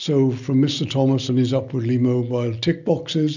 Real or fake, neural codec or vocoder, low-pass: real; none; 7.2 kHz